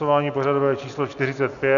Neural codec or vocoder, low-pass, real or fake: none; 7.2 kHz; real